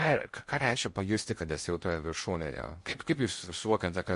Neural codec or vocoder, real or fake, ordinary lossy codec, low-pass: codec, 16 kHz in and 24 kHz out, 0.6 kbps, FocalCodec, streaming, 2048 codes; fake; MP3, 48 kbps; 10.8 kHz